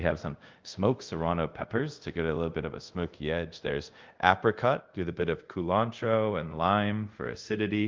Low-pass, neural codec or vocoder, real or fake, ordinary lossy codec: 7.2 kHz; codec, 24 kHz, 0.5 kbps, DualCodec; fake; Opus, 32 kbps